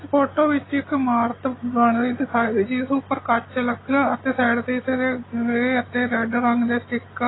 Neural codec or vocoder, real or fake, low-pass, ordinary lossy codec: none; real; 7.2 kHz; AAC, 16 kbps